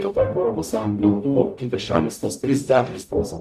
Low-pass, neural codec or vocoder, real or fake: 14.4 kHz; codec, 44.1 kHz, 0.9 kbps, DAC; fake